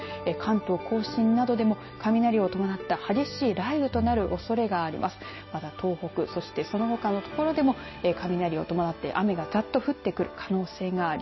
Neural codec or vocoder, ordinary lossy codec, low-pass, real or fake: none; MP3, 24 kbps; 7.2 kHz; real